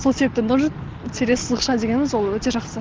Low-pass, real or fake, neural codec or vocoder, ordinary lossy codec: 7.2 kHz; real; none; Opus, 16 kbps